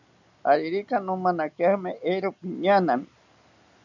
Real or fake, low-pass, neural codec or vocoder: real; 7.2 kHz; none